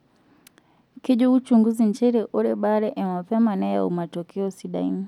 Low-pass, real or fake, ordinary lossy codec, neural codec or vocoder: 19.8 kHz; real; none; none